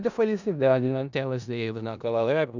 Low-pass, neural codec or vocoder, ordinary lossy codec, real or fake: 7.2 kHz; codec, 16 kHz in and 24 kHz out, 0.4 kbps, LongCat-Audio-Codec, four codebook decoder; none; fake